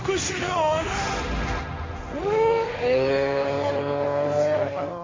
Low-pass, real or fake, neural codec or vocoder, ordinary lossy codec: 7.2 kHz; fake; codec, 16 kHz, 1.1 kbps, Voila-Tokenizer; AAC, 32 kbps